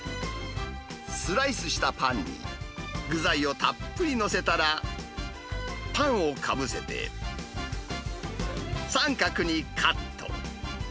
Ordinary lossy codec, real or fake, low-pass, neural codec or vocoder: none; real; none; none